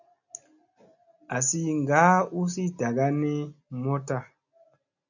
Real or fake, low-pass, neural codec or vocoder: real; 7.2 kHz; none